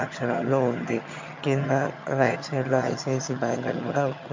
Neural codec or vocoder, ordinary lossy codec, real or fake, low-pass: vocoder, 22.05 kHz, 80 mel bands, HiFi-GAN; MP3, 48 kbps; fake; 7.2 kHz